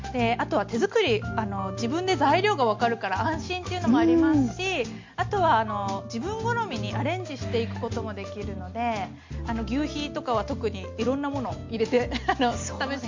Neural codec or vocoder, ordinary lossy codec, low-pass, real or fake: none; MP3, 48 kbps; 7.2 kHz; real